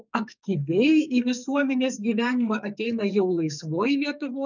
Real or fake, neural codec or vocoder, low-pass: fake; codec, 44.1 kHz, 2.6 kbps, SNAC; 7.2 kHz